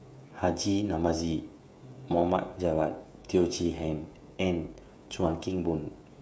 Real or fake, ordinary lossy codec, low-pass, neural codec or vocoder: fake; none; none; codec, 16 kHz, 16 kbps, FreqCodec, smaller model